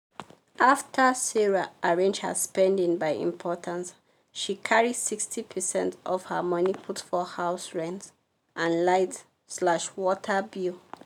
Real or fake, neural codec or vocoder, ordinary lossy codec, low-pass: real; none; none; 19.8 kHz